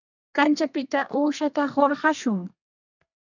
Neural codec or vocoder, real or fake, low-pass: codec, 32 kHz, 1.9 kbps, SNAC; fake; 7.2 kHz